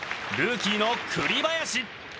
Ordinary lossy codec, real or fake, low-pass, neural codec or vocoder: none; real; none; none